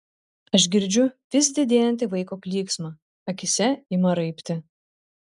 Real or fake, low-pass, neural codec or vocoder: real; 10.8 kHz; none